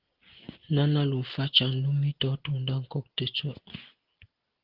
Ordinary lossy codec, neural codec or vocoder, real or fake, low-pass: Opus, 16 kbps; none; real; 5.4 kHz